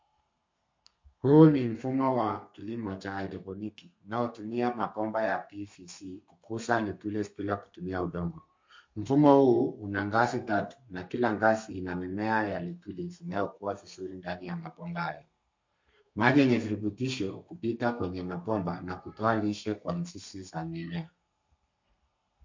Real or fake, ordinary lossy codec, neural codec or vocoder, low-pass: fake; MP3, 48 kbps; codec, 32 kHz, 1.9 kbps, SNAC; 7.2 kHz